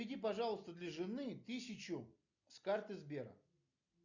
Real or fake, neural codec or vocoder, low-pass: real; none; 7.2 kHz